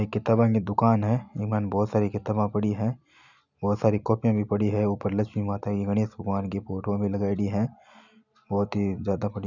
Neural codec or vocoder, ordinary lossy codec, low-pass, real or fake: none; none; 7.2 kHz; real